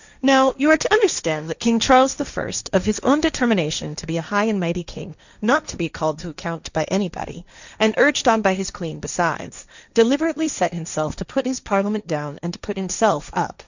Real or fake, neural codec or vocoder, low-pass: fake; codec, 16 kHz, 1.1 kbps, Voila-Tokenizer; 7.2 kHz